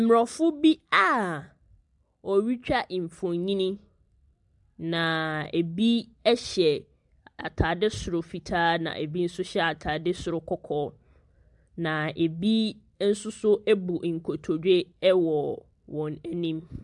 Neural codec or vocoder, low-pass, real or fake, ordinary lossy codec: none; 10.8 kHz; real; MP3, 96 kbps